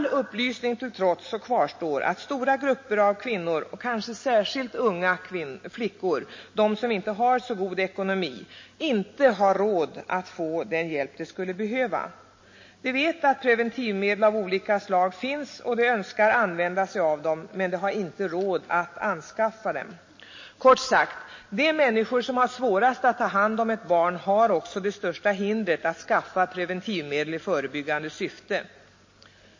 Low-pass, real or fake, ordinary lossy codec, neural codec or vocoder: 7.2 kHz; real; MP3, 32 kbps; none